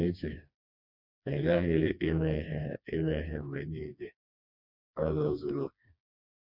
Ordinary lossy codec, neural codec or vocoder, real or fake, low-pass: none; codec, 16 kHz, 2 kbps, FreqCodec, smaller model; fake; 5.4 kHz